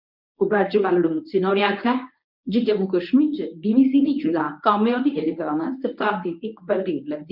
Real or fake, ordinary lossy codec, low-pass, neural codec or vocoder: fake; none; 5.4 kHz; codec, 24 kHz, 0.9 kbps, WavTokenizer, medium speech release version 1